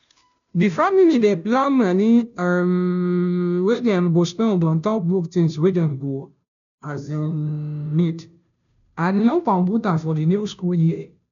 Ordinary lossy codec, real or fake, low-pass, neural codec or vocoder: none; fake; 7.2 kHz; codec, 16 kHz, 0.5 kbps, FunCodec, trained on Chinese and English, 25 frames a second